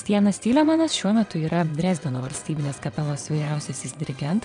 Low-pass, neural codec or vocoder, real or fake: 9.9 kHz; vocoder, 22.05 kHz, 80 mel bands, WaveNeXt; fake